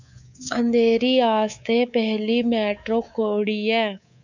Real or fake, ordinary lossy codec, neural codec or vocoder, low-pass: fake; none; codec, 24 kHz, 3.1 kbps, DualCodec; 7.2 kHz